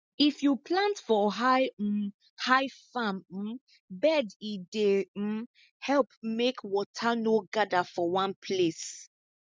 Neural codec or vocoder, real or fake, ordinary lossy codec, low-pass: none; real; none; none